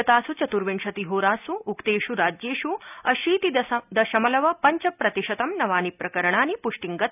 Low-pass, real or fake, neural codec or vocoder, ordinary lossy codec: 3.6 kHz; real; none; none